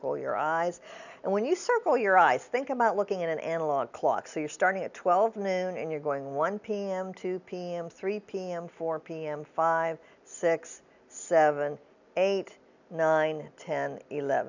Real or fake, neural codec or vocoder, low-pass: real; none; 7.2 kHz